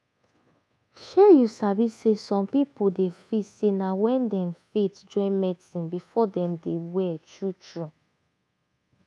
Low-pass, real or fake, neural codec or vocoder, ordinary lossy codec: none; fake; codec, 24 kHz, 1.2 kbps, DualCodec; none